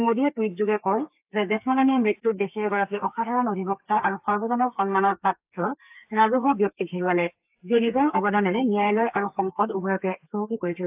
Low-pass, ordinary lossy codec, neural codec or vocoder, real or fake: 3.6 kHz; AAC, 32 kbps; codec, 32 kHz, 1.9 kbps, SNAC; fake